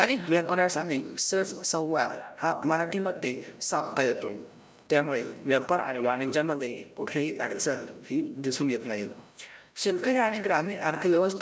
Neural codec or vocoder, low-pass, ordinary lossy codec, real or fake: codec, 16 kHz, 0.5 kbps, FreqCodec, larger model; none; none; fake